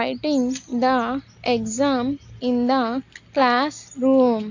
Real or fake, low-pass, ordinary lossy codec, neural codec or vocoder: real; 7.2 kHz; AAC, 48 kbps; none